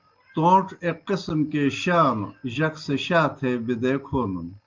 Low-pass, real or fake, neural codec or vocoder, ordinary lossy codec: 7.2 kHz; real; none; Opus, 24 kbps